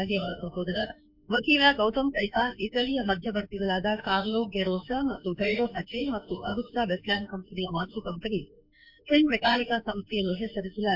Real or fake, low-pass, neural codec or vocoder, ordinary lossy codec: fake; 5.4 kHz; autoencoder, 48 kHz, 32 numbers a frame, DAC-VAE, trained on Japanese speech; MP3, 48 kbps